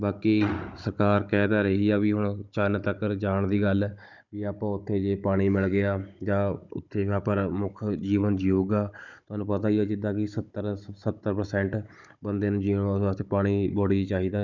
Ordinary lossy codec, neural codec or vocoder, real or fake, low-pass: none; codec, 16 kHz, 16 kbps, FunCodec, trained on Chinese and English, 50 frames a second; fake; 7.2 kHz